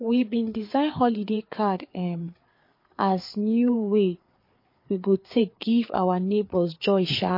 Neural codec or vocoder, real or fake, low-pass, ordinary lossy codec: codec, 16 kHz, 4 kbps, FreqCodec, larger model; fake; 5.4 kHz; MP3, 32 kbps